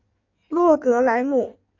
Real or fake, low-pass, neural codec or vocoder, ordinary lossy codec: fake; 7.2 kHz; codec, 16 kHz in and 24 kHz out, 1.1 kbps, FireRedTTS-2 codec; MP3, 48 kbps